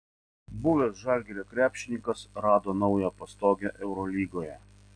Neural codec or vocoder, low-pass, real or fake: none; 9.9 kHz; real